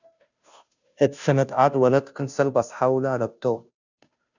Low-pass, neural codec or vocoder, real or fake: 7.2 kHz; codec, 16 kHz, 0.5 kbps, FunCodec, trained on Chinese and English, 25 frames a second; fake